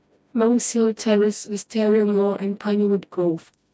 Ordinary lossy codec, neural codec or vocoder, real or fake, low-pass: none; codec, 16 kHz, 1 kbps, FreqCodec, smaller model; fake; none